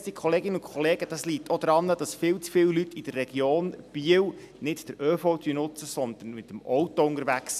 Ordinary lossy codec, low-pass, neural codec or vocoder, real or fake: none; 14.4 kHz; none; real